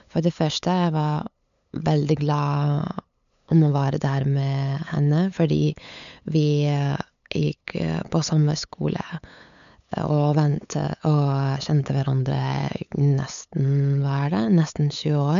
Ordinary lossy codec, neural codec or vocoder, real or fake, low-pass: none; codec, 16 kHz, 8 kbps, FunCodec, trained on LibriTTS, 25 frames a second; fake; 7.2 kHz